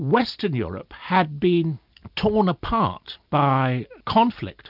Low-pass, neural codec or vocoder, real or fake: 5.4 kHz; none; real